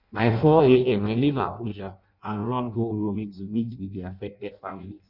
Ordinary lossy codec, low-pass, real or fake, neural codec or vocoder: Opus, 64 kbps; 5.4 kHz; fake; codec, 16 kHz in and 24 kHz out, 0.6 kbps, FireRedTTS-2 codec